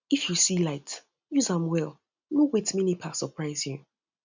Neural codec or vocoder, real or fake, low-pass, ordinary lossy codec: none; real; 7.2 kHz; none